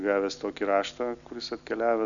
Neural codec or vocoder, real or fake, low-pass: none; real; 7.2 kHz